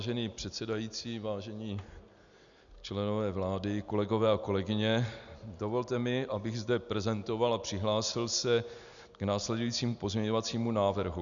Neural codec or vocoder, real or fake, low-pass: none; real; 7.2 kHz